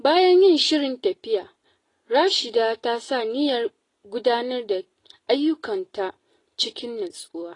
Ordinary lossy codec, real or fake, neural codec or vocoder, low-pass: AAC, 32 kbps; real; none; 10.8 kHz